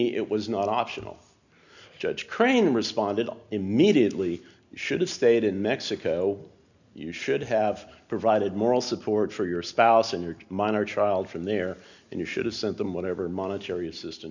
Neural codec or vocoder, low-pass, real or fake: none; 7.2 kHz; real